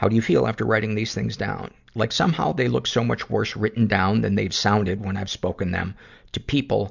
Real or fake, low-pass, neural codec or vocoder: real; 7.2 kHz; none